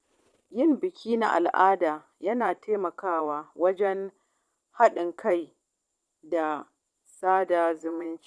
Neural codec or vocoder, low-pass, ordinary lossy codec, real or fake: vocoder, 22.05 kHz, 80 mel bands, Vocos; none; none; fake